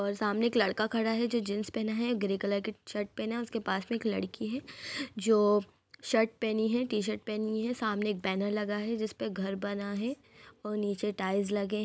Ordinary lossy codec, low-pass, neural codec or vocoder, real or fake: none; none; none; real